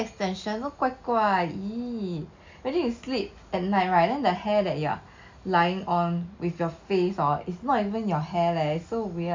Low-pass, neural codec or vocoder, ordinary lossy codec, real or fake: 7.2 kHz; none; MP3, 64 kbps; real